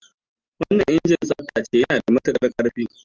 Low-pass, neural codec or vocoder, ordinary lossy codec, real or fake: 7.2 kHz; none; Opus, 24 kbps; real